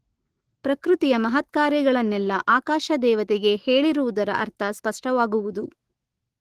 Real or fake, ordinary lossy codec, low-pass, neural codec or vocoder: fake; Opus, 16 kbps; 14.4 kHz; autoencoder, 48 kHz, 128 numbers a frame, DAC-VAE, trained on Japanese speech